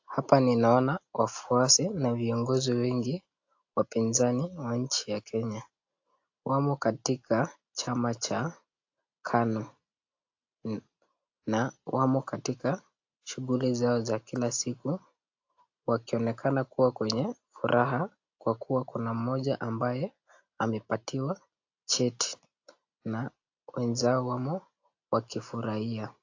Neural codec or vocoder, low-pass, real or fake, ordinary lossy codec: none; 7.2 kHz; real; AAC, 48 kbps